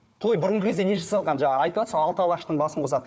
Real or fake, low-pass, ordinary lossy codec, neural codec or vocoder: fake; none; none; codec, 16 kHz, 4 kbps, FunCodec, trained on Chinese and English, 50 frames a second